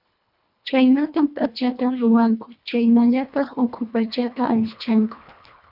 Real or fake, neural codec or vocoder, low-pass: fake; codec, 24 kHz, 1.5 kbps, HILCodec; 5.4 kHz